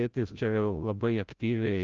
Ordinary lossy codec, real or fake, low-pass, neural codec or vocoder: Opus, 24 kbps; fake; 7.2 kHz; codec, 16 kHz, 0.5 kbps, FreqCodec, larger model